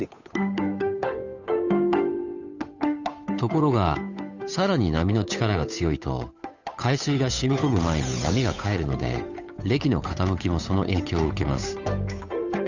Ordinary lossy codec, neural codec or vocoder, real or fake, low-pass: none; codec, 16 kHz, 8 kbps, FunCodec, trained on Chinese and English, 25 frames a second; fake; 7.2 kHz